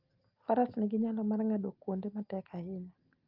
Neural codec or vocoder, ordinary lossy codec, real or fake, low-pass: none; Opus, 24 kbps; real; 5.4 kHz